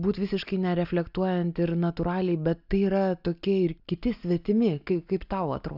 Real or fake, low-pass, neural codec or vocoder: real; 5.4 kHz; none